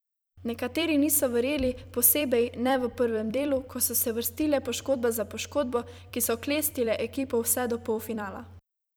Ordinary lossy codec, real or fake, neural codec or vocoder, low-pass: none; fake; vocoder, 44.1 kHz, 128 mel bands every 256 samples, BigVGAN v2; none